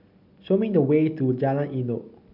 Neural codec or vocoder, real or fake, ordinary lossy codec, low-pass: none; real; MP3, 48 kbps; 5.4 kHz